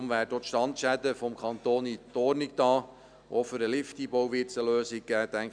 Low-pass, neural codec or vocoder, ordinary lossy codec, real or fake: 9.9 kHz; none; none; real